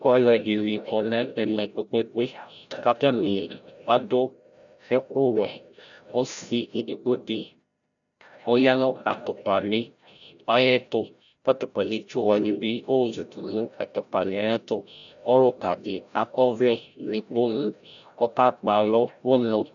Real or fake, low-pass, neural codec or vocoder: fake; 7.2 kHz; codec, 16 kHz, 0.5 kbps, FreqCodec, larger model